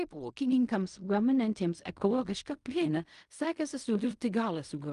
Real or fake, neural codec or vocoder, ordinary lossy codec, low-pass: fake; codec, 16 kHz in and 24 kHz out, 0.4 kbps, LongCat-Audio-Codec, fine tuned four codebook decoder; Opus, 32 kbps; 10.8 kHz